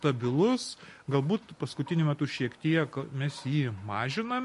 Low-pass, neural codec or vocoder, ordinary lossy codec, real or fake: 14.4 kHz; none; MP3, 48 kbps; real